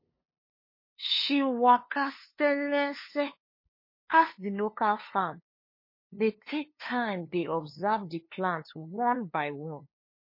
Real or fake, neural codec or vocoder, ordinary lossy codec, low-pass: fake; codec, 16 kHz, 2 kbps, FunCodec, trained on LibriTTS, 25 frames a second; MP3, 24 kbps; 5.4 kHz